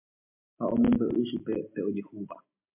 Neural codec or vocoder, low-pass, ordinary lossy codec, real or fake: none; 3.6 kHz; MP3, 32 kbps; real